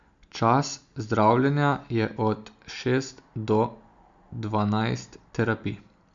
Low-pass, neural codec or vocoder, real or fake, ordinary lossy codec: 7.2 kHz; none; real; Opus, 64 kbps